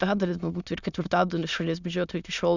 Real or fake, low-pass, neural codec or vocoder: fake; 7.2 kHz; autoencoder, 22.05 kHz, a latent of 192 numbers a frame, VITS, trained on many speakers